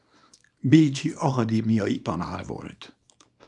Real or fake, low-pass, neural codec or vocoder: fake; 10.8 kHz; codec, 24 kHz, 0.9 kbps, WavTokenizer, small release